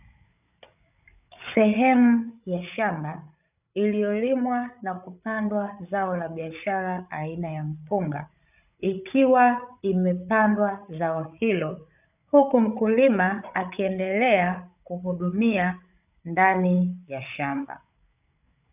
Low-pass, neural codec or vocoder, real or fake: 3.6 kHz; codec, 16 kHz, 8 kbps, FreqCodec, larger model; fake